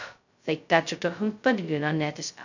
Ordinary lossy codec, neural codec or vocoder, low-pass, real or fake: none; codec, 16 kHz, 0.2 kbps, FocalCodec; 7.2 kHz; fake